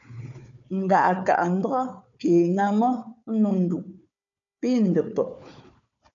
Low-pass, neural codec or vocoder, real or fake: 7.2 kHz; codec, 16 kHz, 4 kbps, FunCodec, trained on Chinese and English, 50 frames a second; fake